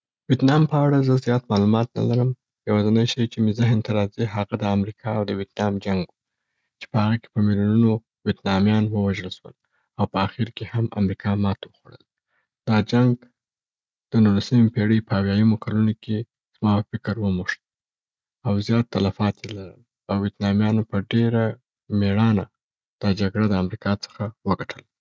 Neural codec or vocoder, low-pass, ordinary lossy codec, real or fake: none; none; none; real